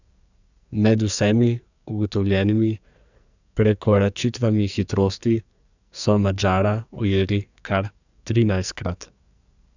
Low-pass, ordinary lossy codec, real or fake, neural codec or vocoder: 7.2 kHz; none; fake; codec, 44.1 kHz, 2.6 kbps, SNAC